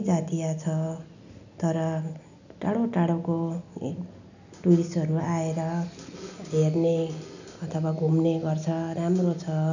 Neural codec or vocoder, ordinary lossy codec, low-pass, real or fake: none; none; 7.2 kHz; real